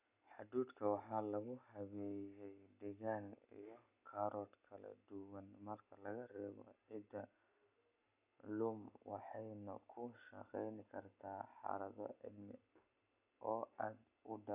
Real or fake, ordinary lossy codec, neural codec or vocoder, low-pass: real; none; none; 3.6 kHz